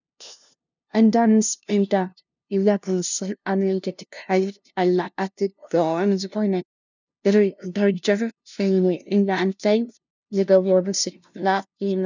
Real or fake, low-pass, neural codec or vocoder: fake; 7.2 kHz; codec, 16 kHz, 0.5 kbps, FunCodec, trained on LibriTTS, 25 frames a second